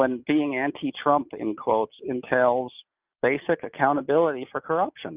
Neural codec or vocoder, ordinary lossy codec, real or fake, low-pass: none; Opus, 24 kbps; real; 3.6 kHz